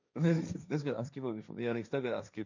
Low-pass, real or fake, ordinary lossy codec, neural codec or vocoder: 7.2 kHz; fake; none; codec, 16 kHz, 1.1 kbps, Voila-Tokenizer